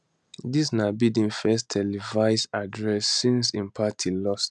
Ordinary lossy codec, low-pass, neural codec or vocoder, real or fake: none; 10.8 kHz; none; real